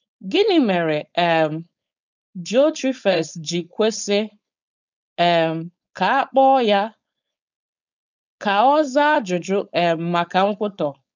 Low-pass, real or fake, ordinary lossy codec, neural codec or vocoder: 7.2 kHz; fake; none; codec, 16 kHz, 4.8 kbps, FACodec